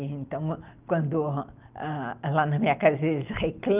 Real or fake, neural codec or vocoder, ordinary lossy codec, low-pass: real; none; Opus, 24 kbps; 3.6 kHz